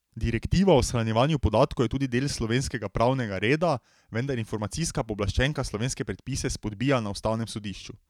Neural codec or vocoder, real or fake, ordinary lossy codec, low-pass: vocoder, 44.1 kHz, 128 mel bands every 512 samples, BigVGAN v2; fake; none; 19.8 kHz